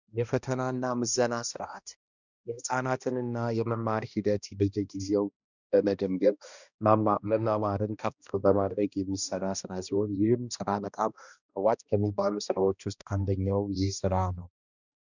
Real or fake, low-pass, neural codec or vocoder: fake; 7.2 kHz; codec, 16 kHz, 1 kbps, X-Codec, HuBERT features, trained on balanced general audio